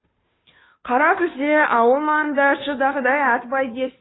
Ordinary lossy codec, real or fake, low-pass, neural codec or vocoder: AAC, 16 kbps; fake; 7.2 kHz; codec, 16 kHz, 2 kbps, FunCodec, trained on Chinese and English, 25 frames a second